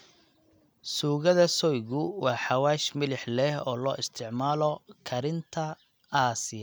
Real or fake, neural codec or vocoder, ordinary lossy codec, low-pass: real; none; none; none